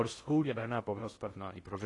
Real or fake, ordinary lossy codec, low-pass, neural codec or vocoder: fake; AAC, 32 kbps; 10.8 kHz; codec, 16 kHz in and 24 kHz out, 0.6 kbps, FocalCodec, streaming, 2048 codes